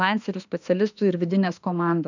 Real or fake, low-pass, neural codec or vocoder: fake; 7.2 kHz; autoencoder, 48 kHz, 32 numbers a frame, DAC-VAE, trained on Japanese speech